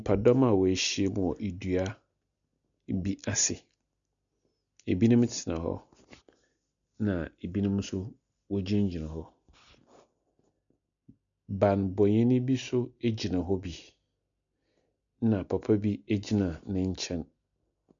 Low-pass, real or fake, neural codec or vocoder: 7.2 kHz; real; none